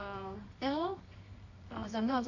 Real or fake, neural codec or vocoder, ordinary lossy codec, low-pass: fake; codec, 24 kHz, 0.9 kbps, WavTokenizer, medium music audio release; none; 7.2 kHz